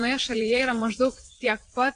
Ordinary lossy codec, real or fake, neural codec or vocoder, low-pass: AAC, 48 kbps; fake; vocoder, 22.05 kHz, 80 mel bands, WaveNeXt; 9.9 kHz